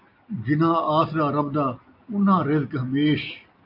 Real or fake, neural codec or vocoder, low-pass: real; none; 5.4 kHz